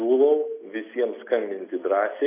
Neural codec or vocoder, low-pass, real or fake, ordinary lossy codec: none; 3.6 kHz; real; AAC, 24 kbps